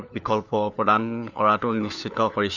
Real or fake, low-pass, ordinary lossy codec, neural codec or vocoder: fake; 7.2 kHz; none; codec, 16 kHz, 4 kbps, FunCodec, trained on LibriTTS, 50 frames a second